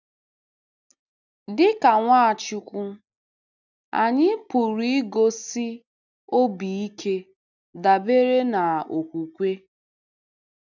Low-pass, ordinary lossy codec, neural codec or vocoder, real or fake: 7.2 kHz; none; none; real